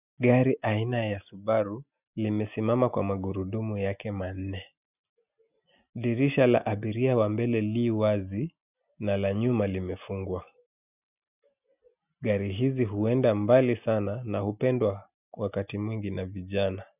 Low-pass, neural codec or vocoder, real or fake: 3.6 kHz; none; real